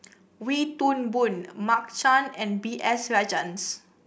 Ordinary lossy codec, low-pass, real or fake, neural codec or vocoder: none; none; real; none